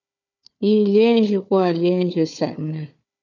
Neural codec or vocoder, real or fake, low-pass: codec, 16 kHz, 4 kbps, FunCodec, trained on Chinese and English, 50 frames a second; fake; 7.2 kHz